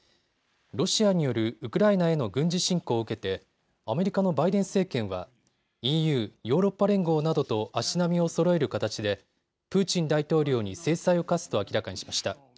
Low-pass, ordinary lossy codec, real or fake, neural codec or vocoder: none; none; real; none